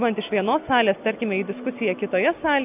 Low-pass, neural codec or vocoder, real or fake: 3.6 kHz; none; real